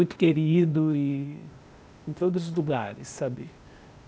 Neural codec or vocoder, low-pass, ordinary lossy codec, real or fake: codec, 16 kHz, 0.8 kbps, ZipCodec; none; none; fake